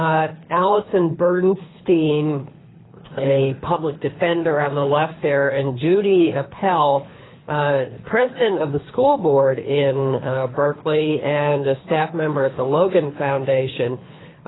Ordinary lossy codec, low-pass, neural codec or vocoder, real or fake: AAC, 16 kbps; 7.2 kHz; codec, 24 kHz, 3 kbps, HILCodec; fake